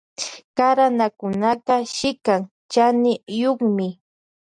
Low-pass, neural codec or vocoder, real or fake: 9.9 kHz; none; real